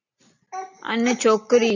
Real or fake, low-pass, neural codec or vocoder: real; 7.2 kHz; none